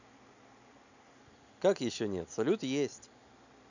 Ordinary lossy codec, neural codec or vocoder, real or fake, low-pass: none; none; real; 7.2 kHz